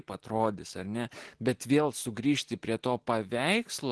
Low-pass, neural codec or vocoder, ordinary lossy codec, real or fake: 10.8 kHz; none; Opus, 16 kbps; real